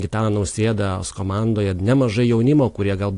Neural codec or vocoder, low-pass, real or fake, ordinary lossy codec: none; 10.8 kHz; real; AAC, 48 kbps